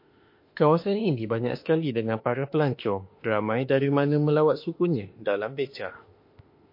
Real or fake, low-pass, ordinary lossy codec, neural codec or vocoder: fake; 5.4 kHz; MP3, 32 kbps; autoencoder, 48 kHz, 32 numbers a frame, DAC-VAE, trained on Japanese speech